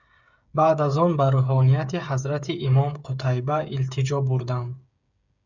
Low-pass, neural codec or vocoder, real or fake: 7.2 kHz; codec, 16 kHz, 8 kbps, FreqCodec, smaller model; fake